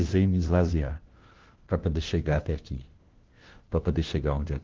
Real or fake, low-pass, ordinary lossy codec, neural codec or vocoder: fake; 7.2 kHz; Opus, 16 kbps; codec, 16 kHz, 1 kbps, FunCodec, trained on LibriTTS, 50 frames a second